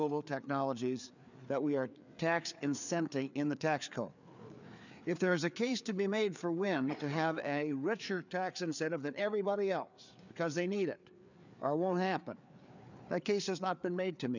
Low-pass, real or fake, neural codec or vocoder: 7.2 kHz; fake; codec, 16 kHz, 4 kbps, FreqCodec, larger model